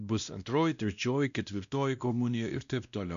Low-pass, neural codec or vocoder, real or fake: 7.2 kHz; codec, 16 kHz, 1 kbps, X-Codec, WavLM features, trained on Multilingual LibriSpeech; fake